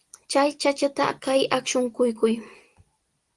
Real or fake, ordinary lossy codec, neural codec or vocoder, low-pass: real; Opus, 24 kbps; none; 10.8 kHz